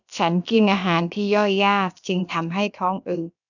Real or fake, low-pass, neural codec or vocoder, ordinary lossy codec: fake; 7.2 kHz; codec, 16 kHz, about 1 kbps, DyCAST, with the encoder's durations; none